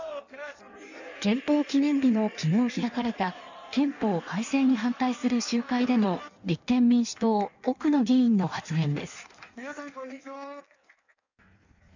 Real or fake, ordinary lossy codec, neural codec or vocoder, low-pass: fake; none; codec, 16 kHz in and 24 kHz out, 1.1 kbps, FireRedTTS-2 codec; 7.2 kHz